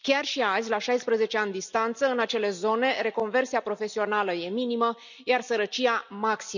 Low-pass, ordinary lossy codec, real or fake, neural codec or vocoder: 7.2 kHz; none; real; none